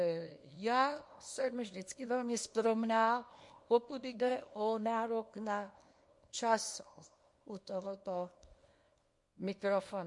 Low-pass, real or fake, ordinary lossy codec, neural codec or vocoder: 10.8 kHz; fake; MP3, 48 kbps; codec, 24 kHz, 0.9 kbps, WavTokenizer, small release